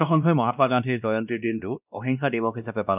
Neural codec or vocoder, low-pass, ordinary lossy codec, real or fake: codec, 16 kHz, 1 kbps, X-Codec, WavLM features, trained on Multilingual LibriSpeech; 3.6 kHz; none; fake